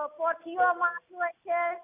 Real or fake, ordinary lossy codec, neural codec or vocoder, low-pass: real; none; none; 3.6 kHz